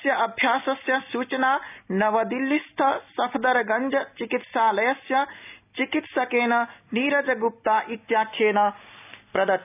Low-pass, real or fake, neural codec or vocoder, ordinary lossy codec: 3.6 kHz; real; none; none